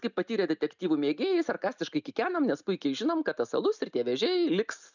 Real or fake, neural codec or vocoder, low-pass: real; none; 7.2 kHz